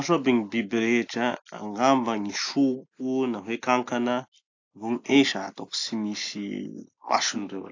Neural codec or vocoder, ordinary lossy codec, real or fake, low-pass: none; AAC, 48 kbps; real; 7.2 kHz